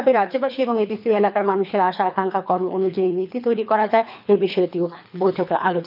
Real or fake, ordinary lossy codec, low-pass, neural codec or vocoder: fake; none; 5.4 kHz; codec, 24 kHz, 3 kbps, HILCodec